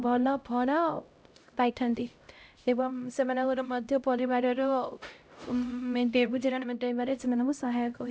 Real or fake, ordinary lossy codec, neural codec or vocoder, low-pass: fake; none; codec, 16 kHz, 0.5 kbps, X-Codec, HuBERT features, trained on LibriSpeech; none